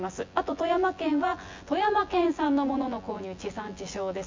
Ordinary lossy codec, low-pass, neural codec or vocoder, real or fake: MP3, 48 kbps; 7.2 kHz; vocoder, 24 kHz, 100 mel bands, Vocos; fake